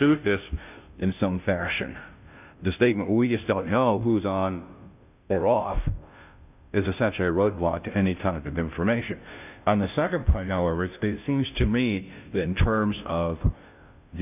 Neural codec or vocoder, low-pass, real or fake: codec, 16 kHz, 0.5 kbps, FunCodec, trained on Chinese and English, 25 frames a second; 3.6 kHz; fake